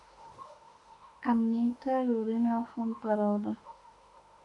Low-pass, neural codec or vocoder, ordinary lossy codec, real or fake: 10.8 kHz; autoencoder, 48 kHz, 32 numbers a frame, DAC-VAE, trained on Japanese speech; AAC, 48 kbps; fake